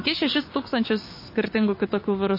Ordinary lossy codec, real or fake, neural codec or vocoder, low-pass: MP3, 24 kbps; fake; codec, 16 kHz, 6 kbps, DAC; 5.4 kHz